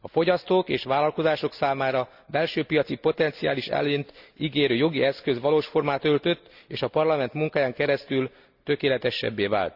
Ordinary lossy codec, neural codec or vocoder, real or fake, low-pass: Opus, 64 kbps; none; real; 5.4 kHz